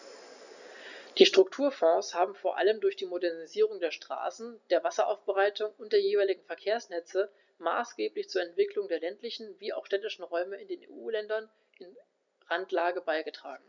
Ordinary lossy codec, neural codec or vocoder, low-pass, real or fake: none; none; 7.2 kHz; real